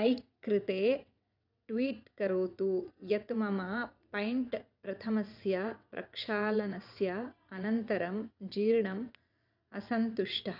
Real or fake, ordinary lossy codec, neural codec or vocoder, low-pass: fake; none; vocoder, 22.05 kHz, 80 mel bands, WaveNeXt; 5.4 kHz